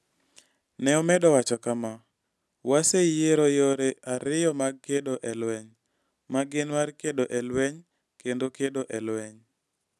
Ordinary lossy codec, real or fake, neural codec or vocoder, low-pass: none; real; none; none